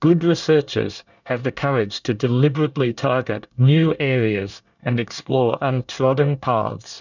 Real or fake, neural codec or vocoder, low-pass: fake; codec, 24 kHz, 1 kbps, SNAC; 7.2 kHz